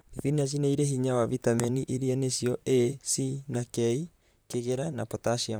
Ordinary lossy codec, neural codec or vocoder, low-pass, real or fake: none; vocoder, 44.1 kHz, 128 mel bands, Pupu-Vocoder; none; fake